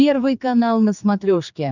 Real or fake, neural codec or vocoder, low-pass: fake; codec, 16 kHz, 4 kbps, X-Codec, HuBERT features, trained on general audio; 7.2 kHz